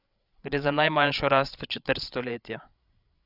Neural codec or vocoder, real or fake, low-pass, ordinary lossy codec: codec, 16 kHz, 16 kbps, FreqCodec, larger model; fake; 5.4 kHz; none